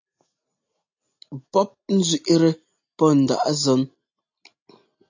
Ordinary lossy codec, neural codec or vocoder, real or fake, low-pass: AAC, 48 kbps; none; real; 7.2 kHz